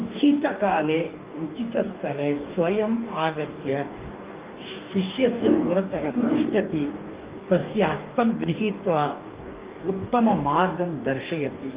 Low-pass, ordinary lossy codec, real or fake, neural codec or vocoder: 3.6 kHz; Opus, 32 kbps; fake; codec, 44.1 kHz, 2.6 kbps, DAC